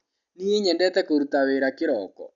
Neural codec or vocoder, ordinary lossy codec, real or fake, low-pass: none; none; real; 7.2 kHz